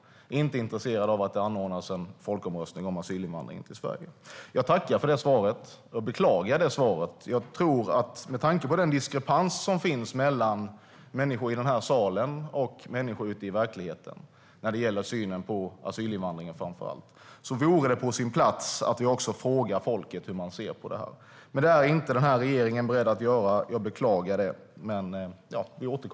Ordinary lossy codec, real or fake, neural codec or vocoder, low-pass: none; real; none; none